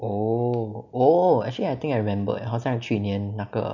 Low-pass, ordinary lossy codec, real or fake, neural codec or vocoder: 7.2 kHz; none; real; none